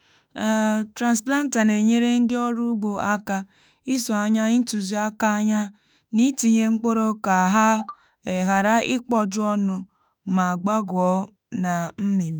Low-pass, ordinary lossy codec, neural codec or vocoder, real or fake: none; none; autoencoder, 48 kHz, 32 numbers a frame, DAC-VAE, trained on Japanese speech; fake